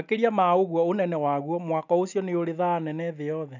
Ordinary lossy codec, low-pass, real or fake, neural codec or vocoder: none; 7.2 kHz; real; none